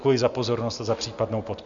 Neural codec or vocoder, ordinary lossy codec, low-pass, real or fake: none; Opus, 64 kbps; 7.2 kHz; real